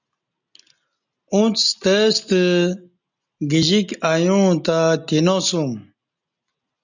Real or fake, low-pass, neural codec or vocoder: real; 7.2 kHz; none